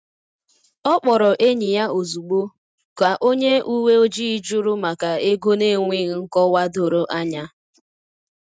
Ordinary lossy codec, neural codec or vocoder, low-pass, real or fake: none; none; none; real